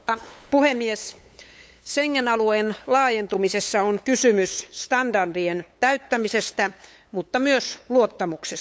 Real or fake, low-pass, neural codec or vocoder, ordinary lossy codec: fake; none; codec, 16 kHz, 8 kbps, FunCodec, trained on LibriTTS, 25 frames a second; none